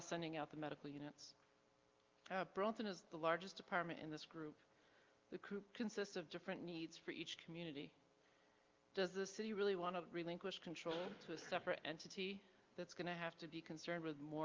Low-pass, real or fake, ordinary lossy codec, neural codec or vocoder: 7.2 kHz; real; Opus, 24 kbps; none